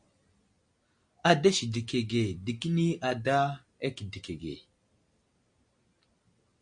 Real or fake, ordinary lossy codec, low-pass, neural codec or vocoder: real; MP3, 48 kbps; 9.9 kHz; none